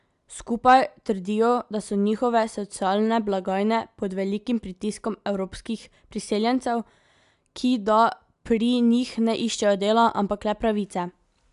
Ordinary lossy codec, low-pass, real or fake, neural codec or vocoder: AAC, 96 kbps; 10.8 kHz; real; none